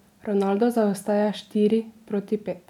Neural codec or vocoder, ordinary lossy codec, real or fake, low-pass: none; none; real; 19.8 kHz